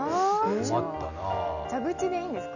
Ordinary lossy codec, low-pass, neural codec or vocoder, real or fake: none; 7.2 kHz; none; real